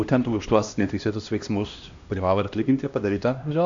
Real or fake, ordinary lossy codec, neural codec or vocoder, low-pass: fake; AAC, 64 kbps; codec, 16 kHz, 1 kbps, X-Codec, HuBERT features, trained on LibriSpeech; 7.2 kHz